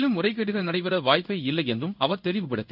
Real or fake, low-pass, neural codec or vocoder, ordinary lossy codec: fake; 5.4 kHz; codec, 16 kHz in and 24 kHz out, 1 kbps, XY-Tokenizer; none